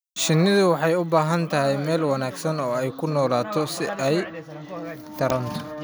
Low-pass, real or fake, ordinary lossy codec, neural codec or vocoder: none; real; none; none